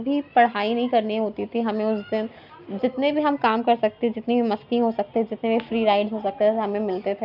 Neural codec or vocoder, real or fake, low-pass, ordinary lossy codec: none; real; 5.4 kHz; none